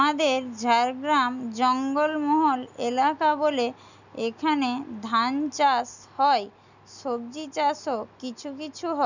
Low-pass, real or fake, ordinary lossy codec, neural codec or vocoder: 7.2 kHz; real; none; none